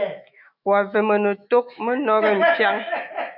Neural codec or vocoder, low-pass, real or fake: autoencoder, 48 kHz, 128 numbers a frame, DAC-VAE, trained on Japanese speech; 5.4 kHz; fake